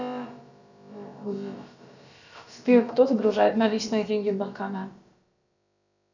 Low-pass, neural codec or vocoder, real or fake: 7.2 kHz; codec, 16 kHz, about 1 kbps, DyCAST, with the encoder's durations; fake